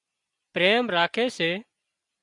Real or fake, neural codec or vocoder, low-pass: real; none; 10.8 kHz